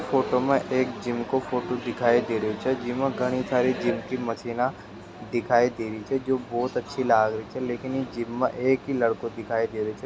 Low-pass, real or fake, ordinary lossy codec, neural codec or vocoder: none; real; none; none